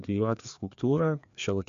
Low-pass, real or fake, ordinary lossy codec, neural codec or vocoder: 7.2 kHz; fake; MP3, 64 kbps; codec, 16 kHz, 2 kbps, FreqCodec, larger model